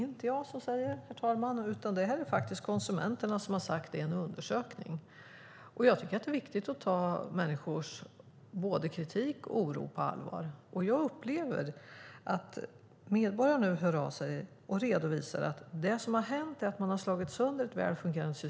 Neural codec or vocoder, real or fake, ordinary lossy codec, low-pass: none; real; none; none